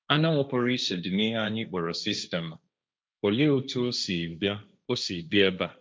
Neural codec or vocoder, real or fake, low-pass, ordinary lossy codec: codec, 16 kHz, 1.1 kbps, Voila-Tokenizer; fake; none; none